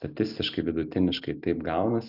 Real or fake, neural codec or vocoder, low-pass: real; none; 5.4 kHz